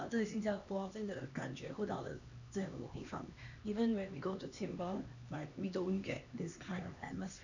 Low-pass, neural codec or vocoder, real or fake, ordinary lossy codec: 7.2 kHz; codec, 16 kHz, 2 kbps, X-Codec, HuBERT features, trained on LibriSpeech; fake; none